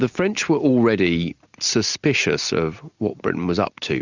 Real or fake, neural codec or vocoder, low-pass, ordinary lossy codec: real; none; 7.2 kHz; Opus, 64 kbps